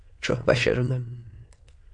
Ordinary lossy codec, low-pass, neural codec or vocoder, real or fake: MP3, 48 kbps; 9.9 kHz; autoencoder, 22.05 kHz, a latent of 192 numbers a frame, VITS, trained on many speakers; fake